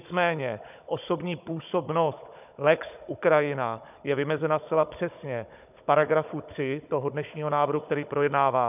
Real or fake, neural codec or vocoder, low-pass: fake; codec, 16 kHz, 16 kbps, FunCodec, trained on Chinese and English, 50 frames a second; 3.6 kHz